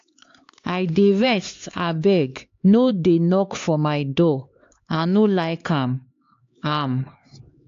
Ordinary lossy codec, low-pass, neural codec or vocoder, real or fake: AAC, 48 kbps; 7.2 kHz; codec, 16 kHz, 4 kbps, X-Codec, HuBERT features, trained on LibriSpeech; fake